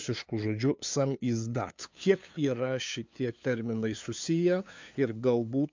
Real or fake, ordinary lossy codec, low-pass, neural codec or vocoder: fake; MP3, 64 kbps; 7.2 kHz; codec, 16 kHz, 2 kbps, FunCodec, trained on LibriTTS, 25 frames a second